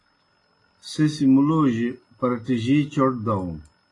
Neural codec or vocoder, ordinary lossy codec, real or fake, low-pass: none; AAC, 32 kbps; real; 10.8 kHz